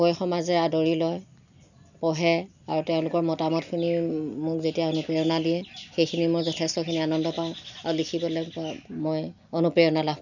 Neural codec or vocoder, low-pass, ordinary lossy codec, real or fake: none; 7.2 kHz; none; real